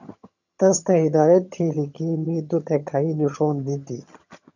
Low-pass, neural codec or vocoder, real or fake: 7.2 kHz; vocoder, 22.05 kHz, 80 mel bands, HiFi-GAN; fake